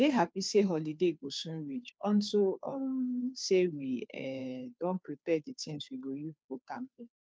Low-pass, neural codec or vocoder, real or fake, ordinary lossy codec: none; codec, 16 kHz, 2 kbps, FunCodec, trained on Chinese and English, 25 frames a second; fake; none